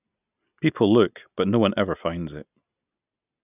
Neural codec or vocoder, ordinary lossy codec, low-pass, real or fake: none; none; 3.6 kHz; real